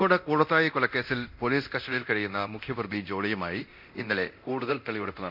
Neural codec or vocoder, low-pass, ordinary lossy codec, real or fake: codec, 24 kHz, 0.9 kbps, DualCodec; 5.4 kHz; none; fake